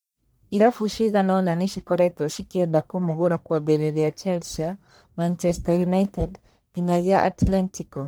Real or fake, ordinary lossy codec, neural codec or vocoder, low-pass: fake; none; codec, 44.1 kHz, 1.7 kbps, Pupu-Codec; none